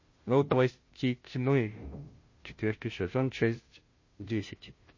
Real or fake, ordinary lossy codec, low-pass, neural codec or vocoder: fake; MP3, 32 kbps; 7.2 kHz; codec, 16 kHz, 0.5 kbps, FunCodec, trained on Chinese and English, 25 frames a second